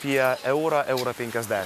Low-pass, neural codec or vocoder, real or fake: 14.4 kHz; none; real